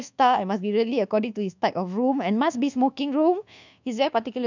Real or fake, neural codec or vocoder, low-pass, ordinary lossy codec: fake; codec, 24 kHz, 1.2 kbps, DualCodec; 7.2 kHz; none